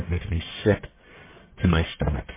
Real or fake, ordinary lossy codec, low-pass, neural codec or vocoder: fake; MP3, 16 kbps; 3.6 kHz; codec, 44.1 kHz, 1.7 kbps, Pupu-Codec